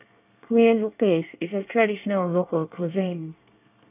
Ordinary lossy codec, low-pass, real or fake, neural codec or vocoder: none; 3.6 kHz; fake; codec, 24 kHz, 1 kbps, SNAC